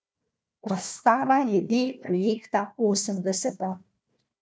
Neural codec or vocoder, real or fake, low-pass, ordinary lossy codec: codec, 16 kHz, 1 kbps, FunCodec, trained on Chinese and English, 50 frames a second; fake; none; none